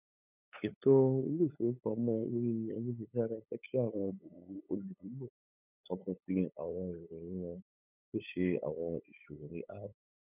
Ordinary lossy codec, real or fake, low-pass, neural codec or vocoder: none; fake; 3.6 kHz; codec, 16 kHz, 8 kbps, FunCodec, trained on LibriTTS, 25 frames a second